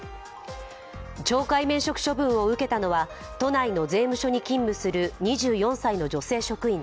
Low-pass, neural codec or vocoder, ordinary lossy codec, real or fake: none; none; none; real